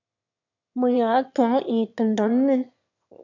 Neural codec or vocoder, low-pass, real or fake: autoencoder, 22.05 kHz, a latent of 192 numbers a frame, VITS, trained on one speaker; 7.2 kHz; fake